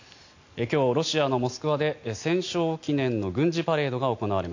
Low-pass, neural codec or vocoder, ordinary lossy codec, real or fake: 7.2 kHz; none; AAC, 48 kbps; real